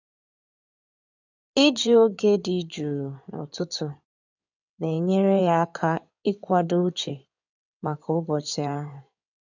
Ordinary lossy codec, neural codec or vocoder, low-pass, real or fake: none; codec, 16 kHz in and 24 kHz out, 2.2 kbps, FireRedTTS-2 codec; 7.2 kHz; fake